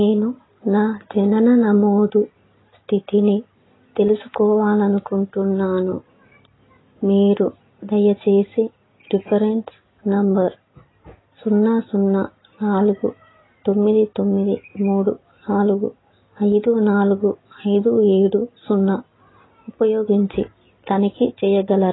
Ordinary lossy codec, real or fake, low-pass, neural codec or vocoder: AAC, 16 kbps; real; 7.2 kHz; none